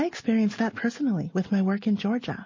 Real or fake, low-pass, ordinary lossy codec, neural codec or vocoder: real; 7.2 kHz; MP3, 32 kbps; none